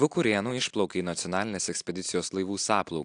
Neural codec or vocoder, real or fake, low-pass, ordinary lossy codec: none; real; 9.9 kHz; AAC, 64 kbps